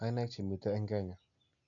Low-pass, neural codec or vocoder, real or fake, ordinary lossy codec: 7.2 kHz; none; real; none